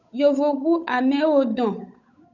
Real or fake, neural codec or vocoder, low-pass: fake; codec, 16 kHz, 8 kbps, FunCodec, trained on Chinese and English, 25 frames a second; 7.2 kHz